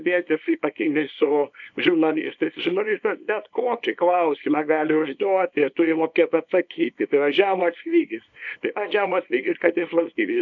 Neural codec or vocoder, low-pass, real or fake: codec, 24 kHz, 0.9 kbps, WavTokenizer, small release; 7.2 kHz; fake